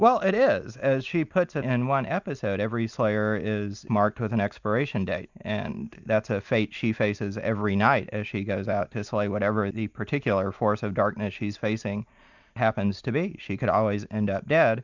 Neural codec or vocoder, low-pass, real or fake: none; 7.2 kHz; real